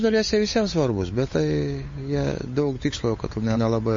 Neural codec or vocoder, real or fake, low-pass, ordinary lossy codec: codec, 16 kHz, 8 kbps, FunCodec, trained on Chinese and English, 25 frames a second; fake; 7.2 kHz; MP3, 32 kbps